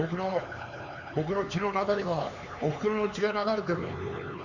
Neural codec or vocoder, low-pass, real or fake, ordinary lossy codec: codec, 16 kHz, 4 kbps, X-Codec, HuBERT features, trained on LibriSpeech; 7.2 kHz; fake; Opus, 64 kbps